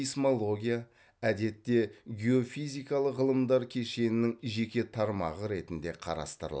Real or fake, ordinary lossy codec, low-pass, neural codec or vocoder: real; none; none; none